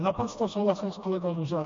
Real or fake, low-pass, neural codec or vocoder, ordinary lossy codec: fake; 7.2 kHz; codec, 16 kHz, 1 kbps, FreqCodec, smaller model; MP3, 48 kbps